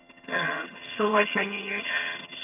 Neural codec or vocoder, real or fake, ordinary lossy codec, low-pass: vocoder, 22.05 kHz, 80 mel bands, HiFi-GAN; fake; none; 3.6 kHz